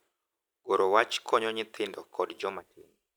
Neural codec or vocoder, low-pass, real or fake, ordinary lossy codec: none; none; real; none